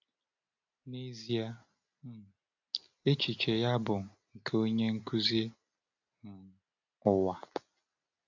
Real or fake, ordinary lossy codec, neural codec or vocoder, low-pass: real; AAC, 48 kbps; none; 7.2 kHz